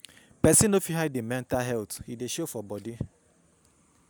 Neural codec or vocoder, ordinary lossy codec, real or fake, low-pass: none; none; real; none